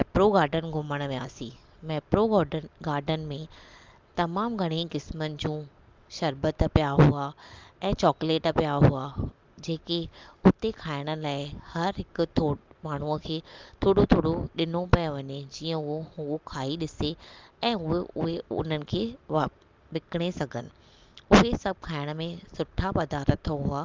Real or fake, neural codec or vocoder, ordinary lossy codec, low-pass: real; none; Opus, 32 kbps; 7.2 kHz